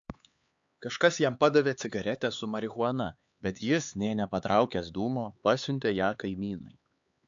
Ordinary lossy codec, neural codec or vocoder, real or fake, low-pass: AAC, 64 kbps; codec, 16 kHz, 4 kbps, X-Codec, HuBERT features, trained on LibriSpeech; fake; 7.2 kHz